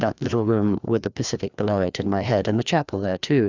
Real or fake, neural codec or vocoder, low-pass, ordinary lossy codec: fake; codec, 16 kHz, 2 kbps, FreqCodec, larger model; 7.2 kHz; Opus, 64 kbps